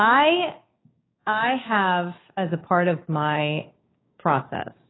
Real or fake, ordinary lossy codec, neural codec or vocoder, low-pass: fake; AAC, 16 kbps; codec, 16 kHz, 6 kbps, DAC; 7.2 kHz